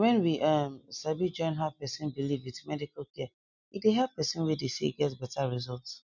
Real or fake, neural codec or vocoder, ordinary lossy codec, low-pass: real; none; none; 7.2 kHz